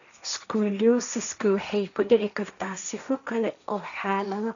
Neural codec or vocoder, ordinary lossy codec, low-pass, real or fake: codec, 16 kHz, 1.1 kbps, Voila-Tokenizer; none; 7.2 kHz; fake